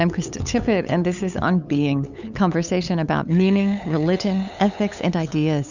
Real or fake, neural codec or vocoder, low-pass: fake; codec, 16 kHz, 8 kbps, FunCodec, trained on LibriTTS, 25 frames a second; 7.2 kHz